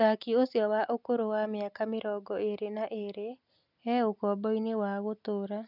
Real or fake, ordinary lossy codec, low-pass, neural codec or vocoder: real; none; 5.4 kHz; none